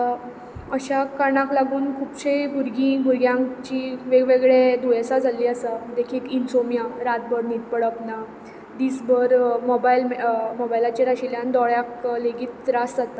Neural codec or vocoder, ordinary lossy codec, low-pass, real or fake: none; none; none; real